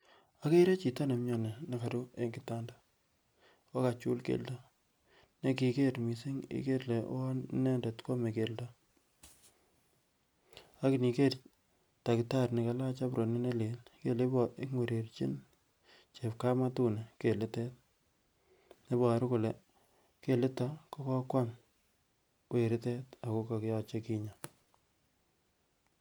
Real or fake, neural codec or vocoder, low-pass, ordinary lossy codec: real; none; none; none